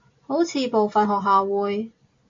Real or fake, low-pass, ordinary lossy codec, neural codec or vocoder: real; 7.2 kHz; AAC, 48 kbps; none